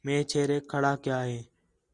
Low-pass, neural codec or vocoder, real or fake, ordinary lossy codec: 10.8 kHz; none; real; Opus, 64 kbps